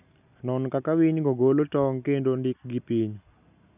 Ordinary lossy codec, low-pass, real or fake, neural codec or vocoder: none; 3.6 kHz; real; none